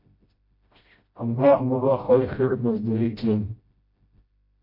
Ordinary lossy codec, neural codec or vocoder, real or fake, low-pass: MP3, 32 kbps; codec, 16 kHz, 0.5 kbps, FreqCodec, smaller model; fake; 5.4 kHz